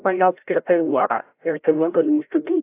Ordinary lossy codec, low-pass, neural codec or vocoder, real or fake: AAC, 24 kbps; 3.6 kHz; codec, 16 kHz, 0.5 kbps, FreqCodec, larger model; fake